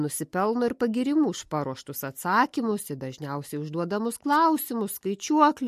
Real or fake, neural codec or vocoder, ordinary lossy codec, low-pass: real; none; MP3, 64 kbps; 14.4 kHz